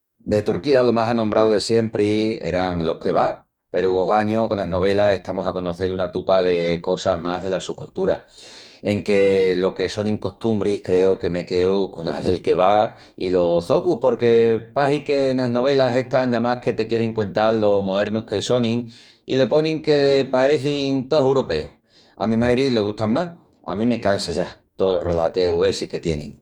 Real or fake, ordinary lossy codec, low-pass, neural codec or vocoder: fake; none; 19.8 kHz; codec, 44.1 kHz, 2.6 kbps, DAC